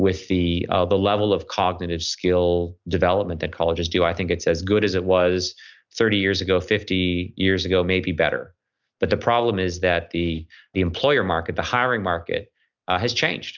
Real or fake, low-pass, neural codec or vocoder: real; 7.2 kHz; none